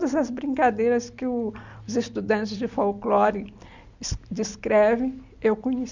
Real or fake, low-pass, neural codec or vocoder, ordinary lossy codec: real; 7.2 kHz; none; Opus, 64 kbps